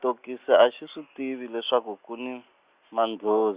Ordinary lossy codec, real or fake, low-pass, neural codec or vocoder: Opus, 64 kbps; real; 3.6 kHz; none